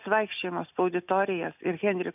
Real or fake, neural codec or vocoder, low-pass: real; none; 3.6 kHz